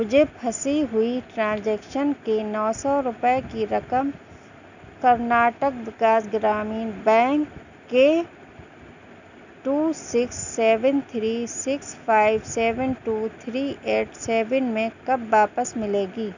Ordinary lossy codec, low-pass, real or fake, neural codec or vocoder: none; 7.2 kHz; real; none